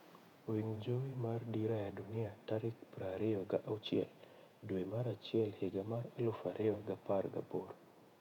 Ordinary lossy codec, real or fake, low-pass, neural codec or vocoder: none; fake; 19.8 kHz; vocoder, 48 kHz, 128 mel bands, Vocos